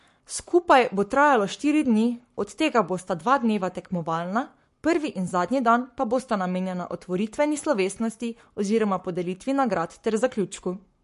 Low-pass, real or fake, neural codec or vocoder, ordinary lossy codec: 14.4 kHz; fake; codec, 44.1 kHz, 7.8 kbps, Pupu-Codec; MP3, 48 kbps